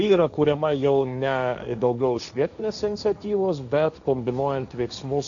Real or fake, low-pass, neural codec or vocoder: fake; 7.2 kHz; codec, 16 kHz, 1.1 kbps, Voila-Tokenizer